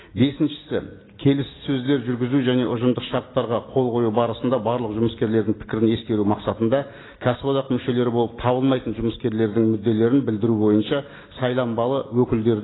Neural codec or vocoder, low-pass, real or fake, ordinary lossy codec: none; 7.2 kHz; real; AAC, 16 kbps